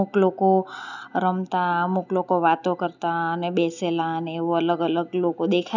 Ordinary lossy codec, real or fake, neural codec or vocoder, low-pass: none; real; none; 7.2 kHz